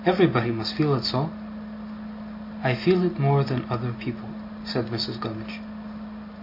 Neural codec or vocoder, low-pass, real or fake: none; 5.4 kHz; real